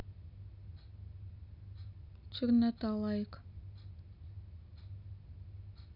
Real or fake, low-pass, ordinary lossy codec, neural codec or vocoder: real; 5.4 kHz; none; none